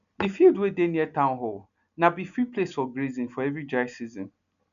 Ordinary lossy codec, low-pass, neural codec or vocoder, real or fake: none; 7.2 kHz; none; real